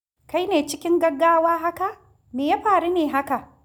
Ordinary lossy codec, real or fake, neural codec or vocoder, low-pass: none; real; none; none